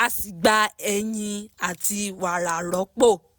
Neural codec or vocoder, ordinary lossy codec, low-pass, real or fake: none; none; none; real